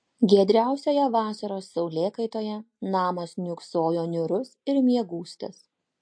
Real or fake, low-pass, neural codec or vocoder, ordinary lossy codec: real; 9.9 kHz; none; MP3, 48 kbps